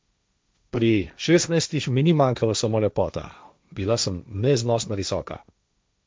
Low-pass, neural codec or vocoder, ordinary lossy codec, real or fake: none; codec, 16 kHz, 1.1 kbps, Voila-Tokenizer; none; fake